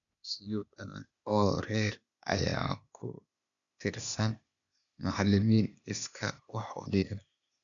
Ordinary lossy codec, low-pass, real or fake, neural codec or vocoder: none; 7.2 kHz; fake; codec, 16 kHz, 0.8 kbps, ZipCodec